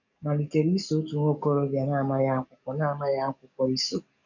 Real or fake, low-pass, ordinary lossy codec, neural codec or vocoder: fake; 7.2 kHz; none; codec, 44.1 kHz, 7.8 kbps, DAC